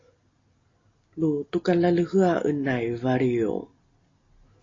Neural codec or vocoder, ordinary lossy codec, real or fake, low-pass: none; AAC, 32 kbps; real; 7.2 kHz